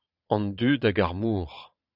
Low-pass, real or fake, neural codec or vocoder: 5.4 kHz; fake; vocoder, 22.05 kHz, 80 mel bands, Vocos